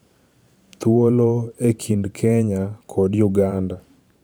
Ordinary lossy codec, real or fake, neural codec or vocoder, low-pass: none; fake; vocoder, 44.1 kHz, 128 mel bands every 512 samples, BigVGAN v2; none